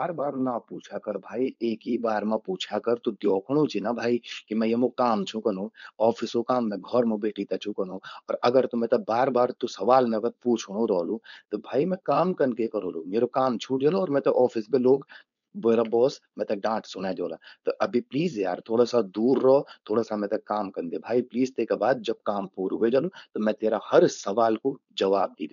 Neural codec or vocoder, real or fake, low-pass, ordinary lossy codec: codec, 16 kHz, 4.8 kbps, FACodec; fake; 7.2 kHz; none